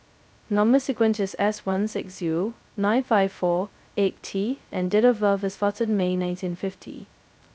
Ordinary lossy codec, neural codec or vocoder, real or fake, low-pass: none; codec, 16 kHz, 0.2 kbps, FocalCodec; fake; none